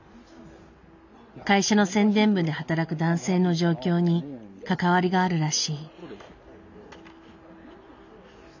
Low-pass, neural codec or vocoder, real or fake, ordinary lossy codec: 7.2 kHz; none; real; none